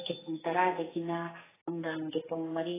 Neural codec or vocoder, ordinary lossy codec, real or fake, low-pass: codec, 44.1 kHz, 2.6 kbps, SNAC; AAC, 16 kbps; fake; 3.6 kHz